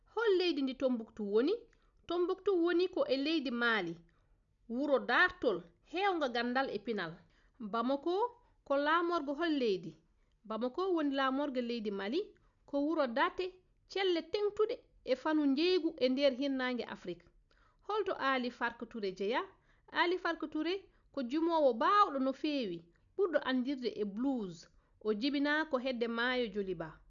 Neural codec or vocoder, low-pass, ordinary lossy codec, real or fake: none; 7.2 kHz; none; real